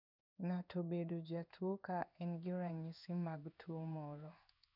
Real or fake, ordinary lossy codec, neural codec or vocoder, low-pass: fake; none; codec, 16 kHz in and 24 kHz out, 1 kbps, XY-Tokenizer; 5.4 kHz